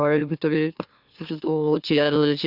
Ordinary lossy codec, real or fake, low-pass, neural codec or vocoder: Opus, 64 kbps; fake; 5.4 kHz; autoencoder, 44.1 kHz, a latent of 192 numbers a frame, MeloTTS